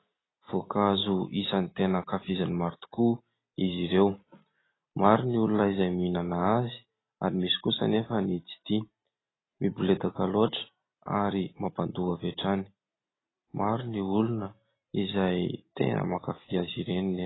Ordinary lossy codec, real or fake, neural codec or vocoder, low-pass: AAC, 16 kbps; real; none; 7.2 kHz